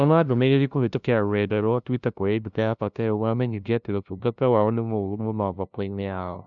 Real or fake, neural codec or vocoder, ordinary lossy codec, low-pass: fake; codec, 16 kHz, 0.5 kbps, FunCodec, trained on LibriTTS, 25 frames a second; none; 7.2 kHz